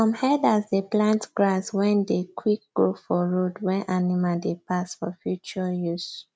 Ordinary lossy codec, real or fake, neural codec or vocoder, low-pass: none; real; none; none